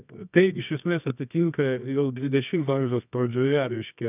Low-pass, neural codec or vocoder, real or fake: 3.6 kHz; codec, 24 kHz, 0.9 kbps, WavTokenizer, medium music audio release; fake